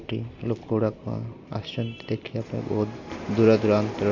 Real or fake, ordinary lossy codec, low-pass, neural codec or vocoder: real; MP3, 48 kbps; 7.2 kHz; none